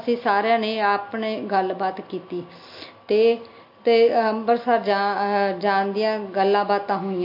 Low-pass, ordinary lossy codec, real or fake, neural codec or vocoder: 5.4 kHz; MP3, 32 kbps; real; none